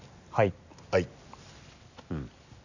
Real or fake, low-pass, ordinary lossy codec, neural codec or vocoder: real; 7.2 kHz; none; none